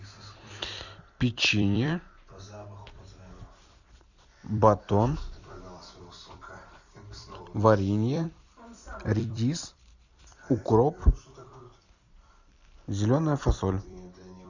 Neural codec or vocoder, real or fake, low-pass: vocoder, 44.1 kHz, 128 mel bands every 256 samples, BigVGAN v2; fake; 7.2 kHz